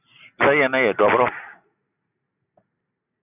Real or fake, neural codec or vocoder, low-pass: real; none; 3.6 kHz